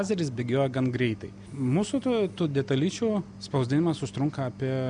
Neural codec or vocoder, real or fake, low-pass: none; real; 9.9 kHz